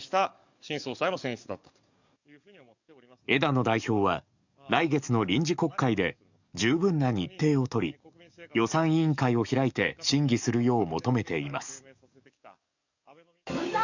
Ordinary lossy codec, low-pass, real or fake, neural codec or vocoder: none; 7.2 kHz; fake; codec, 44.1 kHz, 7.8 kbps, DAC